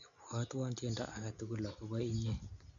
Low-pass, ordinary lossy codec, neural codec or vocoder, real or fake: 7.2 kHz; none; none; real